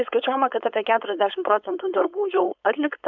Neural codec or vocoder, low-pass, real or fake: codec, 16 kHz, 4.8 kbps, FACodec; 7.2 kHz; fake